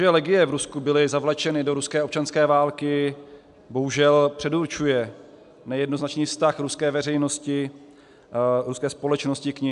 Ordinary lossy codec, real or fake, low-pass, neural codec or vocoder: AAC, 96 kbps; real; 10.8 kHz; none